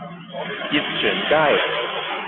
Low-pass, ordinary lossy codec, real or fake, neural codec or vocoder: 7.2 kHz; AAC, 32 kbps; real; none